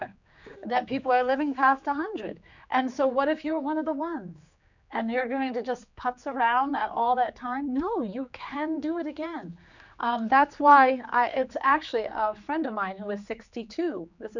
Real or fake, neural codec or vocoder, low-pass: fake; codec, 16 kHz, 4 kbps, X-Codec, HuBERT features, trained on general audio; 7.2 kHz